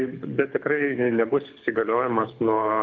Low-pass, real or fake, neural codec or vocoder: 7.2 kHz; fake; codec, 24 kHz, 6 kbps, HILCodec